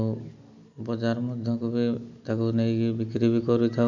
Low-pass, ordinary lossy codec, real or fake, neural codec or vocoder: 7.2 kHz; none; real; none